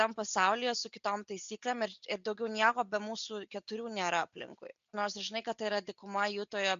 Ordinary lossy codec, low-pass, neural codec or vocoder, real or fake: MP3, 64 kbps; 7.2 kHz; none; real